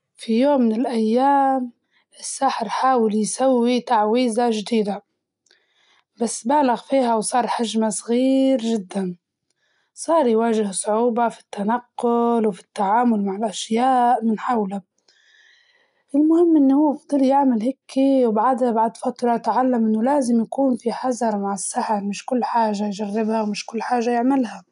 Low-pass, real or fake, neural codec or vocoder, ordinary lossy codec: 10.8 kHz; real; none; none